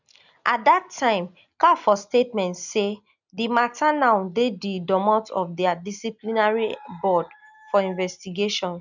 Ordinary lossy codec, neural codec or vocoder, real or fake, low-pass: none; none; real; 7.2 kHz